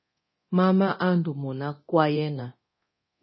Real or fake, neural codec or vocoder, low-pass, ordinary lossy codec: fake; codec, 24 kHz, 0.9 kbps, DualCodec; 7.2 kHz; MP3, 24 kbps